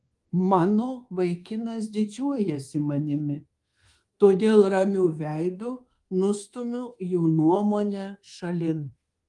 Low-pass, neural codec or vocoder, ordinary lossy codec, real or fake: 10.8 kHz; codec, 24 kHz, 1.2 kbps, DualCodec; Opus, 24 kbps; fake